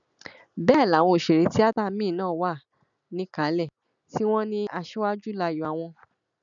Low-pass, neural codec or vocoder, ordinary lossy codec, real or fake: 7.2 kHz; none; none; real